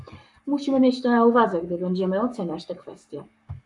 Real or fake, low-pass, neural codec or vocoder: fake; 10.8 kHz; codec, 44.1 kHz, 7.8 kbps, Pupu-Codec